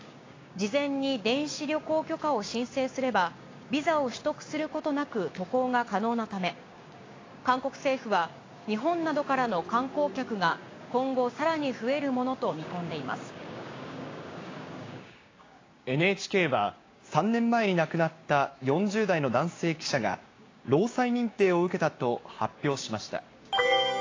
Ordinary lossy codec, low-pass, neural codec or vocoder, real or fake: AAC, 32 kbps; 7.2 kHz; codec, 16 kHz, 6 kbps, DAC; fake